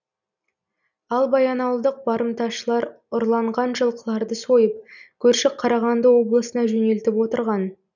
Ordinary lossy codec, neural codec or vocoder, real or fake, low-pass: none; none; real; 7.2 kHz